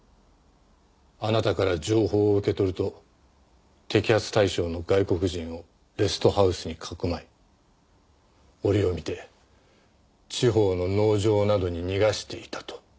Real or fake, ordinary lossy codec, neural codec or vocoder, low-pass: real; none; none; none